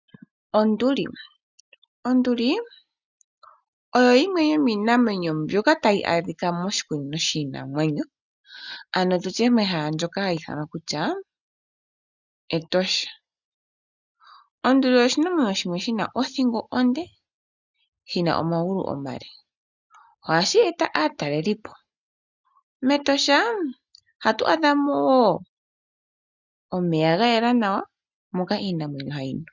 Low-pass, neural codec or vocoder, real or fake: 7.2 kHz; none; real